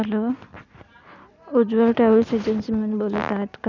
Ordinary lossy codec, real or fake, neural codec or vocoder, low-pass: none; real; none; 7.2 kHz